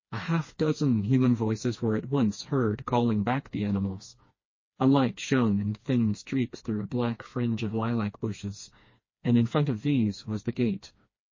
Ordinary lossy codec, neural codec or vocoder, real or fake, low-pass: MP3, 32 kbps; codec, 16 kHz, 2 kbps, FreqCodec, smaller model; fake; 7.2 kHz